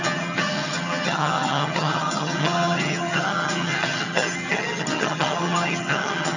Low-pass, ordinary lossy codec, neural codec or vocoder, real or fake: 7.2 kHz; none; vocoder, 22.05 kHz, 80 mel bands, HiFi-GAN; fake